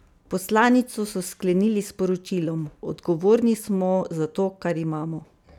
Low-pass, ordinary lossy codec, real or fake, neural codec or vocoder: 19.8 kHz; none; real; none